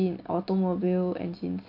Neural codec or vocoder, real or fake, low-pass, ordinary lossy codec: none; real; 5.4 kHz; none